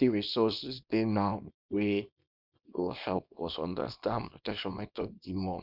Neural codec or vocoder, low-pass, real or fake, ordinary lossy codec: codec, 24 kHz, 0.9 kbps, WavTokenizer, small release; 5.4 kHz; fake; none